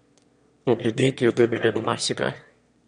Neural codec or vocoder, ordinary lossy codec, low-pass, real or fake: autoencoder, 22.05 kHz, a latent of 192 numbers a frame, VITS, trained on one speaker; MP3, 64 kbps; 9.9 kHz; fake